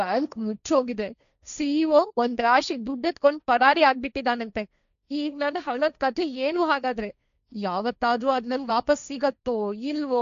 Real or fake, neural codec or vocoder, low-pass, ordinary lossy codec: fake; codec, 16 kHz, 1.1 kbps, Voila-Tokenizer; 7.2 kHz; none